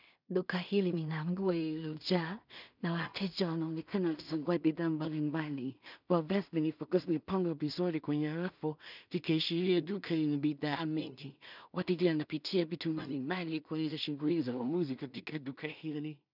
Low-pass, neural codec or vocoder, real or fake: 5.4 kHz; codec, 16 kHz in and 24 kHz out, 0.4 kbps, LongCat-Audio-Codec, two codebook decoder; fake